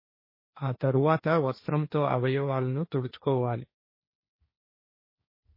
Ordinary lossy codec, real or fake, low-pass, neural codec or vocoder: MP3, 24 kbps; fake; 5.4 kHz; codec, 16 kHz, 1.1 kbps, Voila-Tokenizer